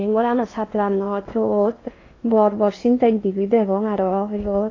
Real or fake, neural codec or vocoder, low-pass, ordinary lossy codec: fake; codec, 16 kHz in and 24 kHz out, 0.8 kbps, FocalCodec, streaming, 65536 codes; 7.2 kHz; AAC, 32 kbps